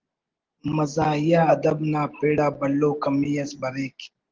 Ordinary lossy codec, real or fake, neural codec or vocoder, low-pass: Opus, 16 kbps; real; none; 7.2 kHz